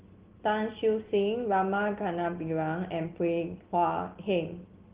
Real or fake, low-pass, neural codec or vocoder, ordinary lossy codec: real; 3.6 kHz; none; Opus, 16 kbps